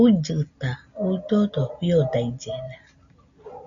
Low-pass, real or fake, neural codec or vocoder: 7.2 kHz; real; none